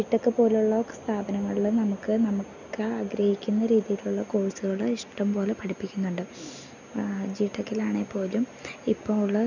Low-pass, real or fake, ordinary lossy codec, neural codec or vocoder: 7.2 kHz; real; none; none